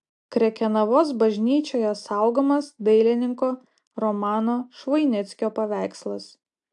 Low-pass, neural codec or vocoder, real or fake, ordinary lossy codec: 10.8 kHz; none; real; AAC, 64 kbps